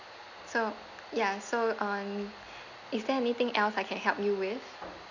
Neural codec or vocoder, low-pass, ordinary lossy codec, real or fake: none; 7.2 kHz; none; real